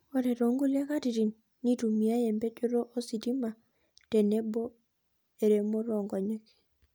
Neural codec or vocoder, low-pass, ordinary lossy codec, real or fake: none; none; none; real